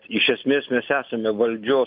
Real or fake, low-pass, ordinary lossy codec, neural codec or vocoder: real; 5.4 kHz; AAC, 48 kbps; none